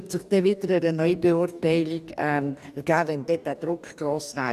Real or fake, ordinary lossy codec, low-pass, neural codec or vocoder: fake; none; 14.4 kHz; codec, 44.1 kHz, 2.6 kbps, DAC